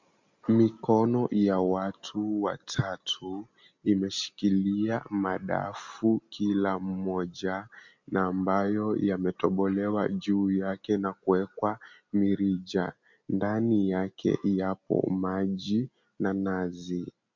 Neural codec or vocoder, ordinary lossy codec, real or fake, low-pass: none; MP3, 64 kbps; real; 7.2 kHz